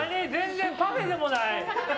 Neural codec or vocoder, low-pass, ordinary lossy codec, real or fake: none; none; none; real